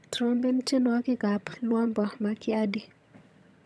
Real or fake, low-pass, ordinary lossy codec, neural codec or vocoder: fake; none; none; vocoder, 22.05 kHz, 80 mel bands, HiFi-GAN